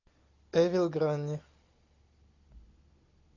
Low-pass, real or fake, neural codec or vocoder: 7.2 kHz; real; none